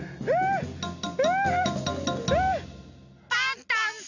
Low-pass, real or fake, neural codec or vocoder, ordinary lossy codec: 7.2 kHz; real; none; none